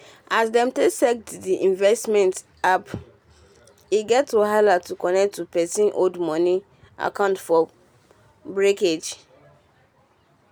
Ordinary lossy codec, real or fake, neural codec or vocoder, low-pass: none; real; none; none